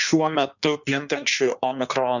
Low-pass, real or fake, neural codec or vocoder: 7.2 kHz; fake; codec, 16 kHz in and 24 kHz out, 1.1 kbps, FireRedTTS-2 codec